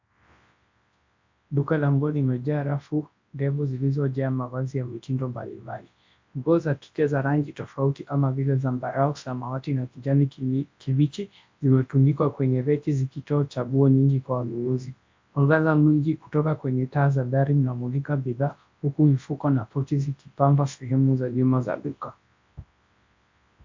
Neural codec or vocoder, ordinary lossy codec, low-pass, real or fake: codec, 24 kHz, 0.9 kbps, WavTokenizer, large speech release; MP3, 48 kbps; 7.2 kHz; fake